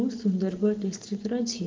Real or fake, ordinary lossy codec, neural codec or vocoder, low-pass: real; Opus, 16 kbps; none; 7.2 kHz